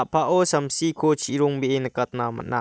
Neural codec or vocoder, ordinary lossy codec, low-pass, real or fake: none; none; none; real